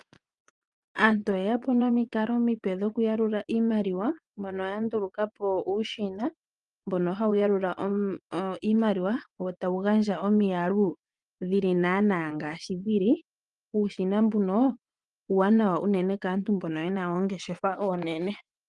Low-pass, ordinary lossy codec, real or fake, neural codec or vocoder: 10.8 kHz; Opus, 32 kbps; fake; vocoder, 24 kHz, 100 mel bands, Vocos